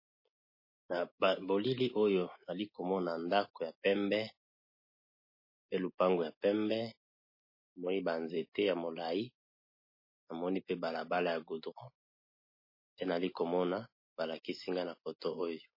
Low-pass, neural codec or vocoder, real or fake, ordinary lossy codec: 5.4 kHz; none; real; MP3, 24 kbps